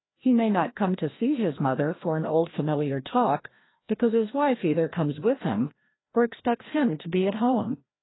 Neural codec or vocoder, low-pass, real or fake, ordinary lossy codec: codec, 16 kHz, 1 kbps, FreqCodec, larger model; 7.2 kHz; fake; AAC, 16 kbps